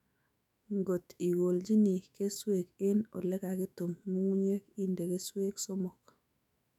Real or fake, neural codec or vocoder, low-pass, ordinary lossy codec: fake; autoencoder, 48 kHz, 128 numbers a frame, DAC-VAE, trained on Japanese speech; 19.8 kHz; none